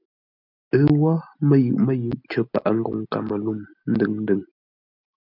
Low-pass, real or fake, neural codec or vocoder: 5.4 kHz; real; none